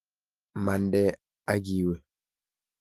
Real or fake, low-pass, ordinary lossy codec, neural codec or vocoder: fake; 14.4 kHz; Opus, 24 kbps; autoencoder, 48 kHz, 128 numbers a frame, DAC-VAE, trained on Japanese speech